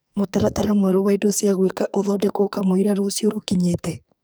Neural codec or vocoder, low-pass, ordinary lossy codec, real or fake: codec, 44.1 kHz, 2.6 kbps, SNAC; none; none; fake